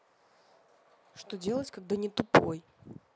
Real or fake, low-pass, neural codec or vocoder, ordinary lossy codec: real; none; none; none